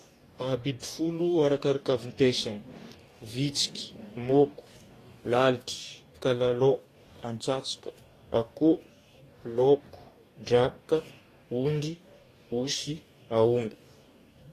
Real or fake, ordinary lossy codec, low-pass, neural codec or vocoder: fake; AAC, 48 kbps; 14.4 kHz; codec, 44.1 kHz, 2.6 kbps, DAC